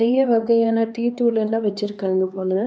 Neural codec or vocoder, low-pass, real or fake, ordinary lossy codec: codec, 16 kHz, 2 kbps, X-Codec, HuBERT features, trained on LibriSpeech; none; fake; none